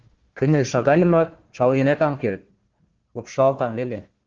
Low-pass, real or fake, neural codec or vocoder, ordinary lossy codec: 7.2 kHz; fake; codec, 16 kHz, 1 kbps, FunCodec, trained on Chinese and English, 50 frames a second; Opus, 16 kbps